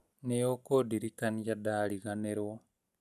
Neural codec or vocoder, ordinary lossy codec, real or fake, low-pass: none; none; real; 14.4 kHz